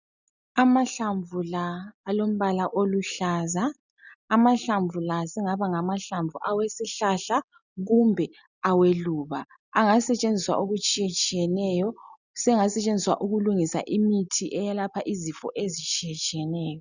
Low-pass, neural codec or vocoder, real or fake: 7.2 kHz; none; real